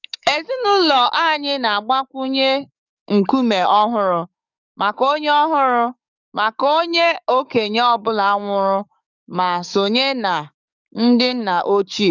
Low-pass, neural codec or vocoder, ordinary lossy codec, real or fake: 7.2 kHz; codec, 44.1 kHz, 7.8 kbps, DAC; none; fake